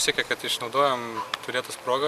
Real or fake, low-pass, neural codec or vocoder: real; 14.4 kHz; none